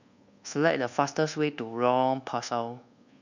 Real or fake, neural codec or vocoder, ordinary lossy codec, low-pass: fake; codec, 24 kHz, 1.2 kbps, DualCodec; none; 7.2 kHz